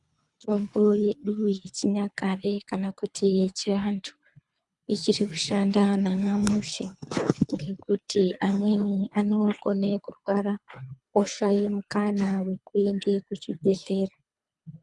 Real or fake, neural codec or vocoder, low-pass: fake; codec, 24 kHz, 3 kbps, HILCodec; 10.8 kHz